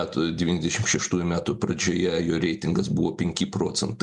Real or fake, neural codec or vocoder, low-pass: real; none; 10.8 kHz